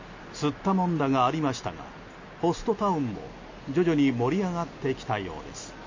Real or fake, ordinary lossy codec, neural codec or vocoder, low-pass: real; MP3, 32 kbps; none; 7.2 kHz